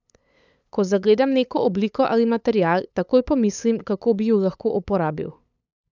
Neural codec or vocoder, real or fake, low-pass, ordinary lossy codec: codec, 16 kHz, 8 kbps, FunCodec, trained on LibriTTS, 25 frames a second; fake; 7.2 kHz; none